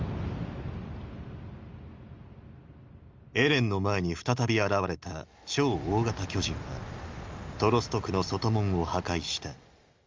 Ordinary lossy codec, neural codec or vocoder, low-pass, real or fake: Opus, 32 kbps; none; 7.2 kHz; real